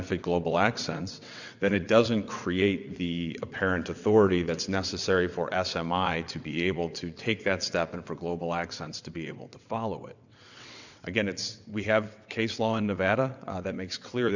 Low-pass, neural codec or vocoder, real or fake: 7.2 kHz; vocoder, 22.05 kHz, 80 mel bands, WaveNeXt; fake